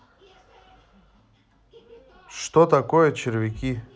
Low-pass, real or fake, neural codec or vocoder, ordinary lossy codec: none; real; none; none